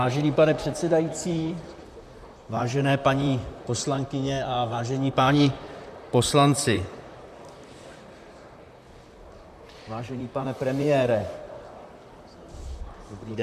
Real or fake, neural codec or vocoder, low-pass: fake; vocoder, 44.1 kHz, 128 mel bands, Pupu-Vocoder; 14.4 kHz